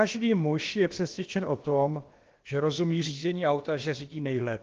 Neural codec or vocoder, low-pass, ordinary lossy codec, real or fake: codec, 16 kHz, about 1 kbps, DyCAST, with the encoder's durations; 7.2 kHz; Opus, 16 kbps; fake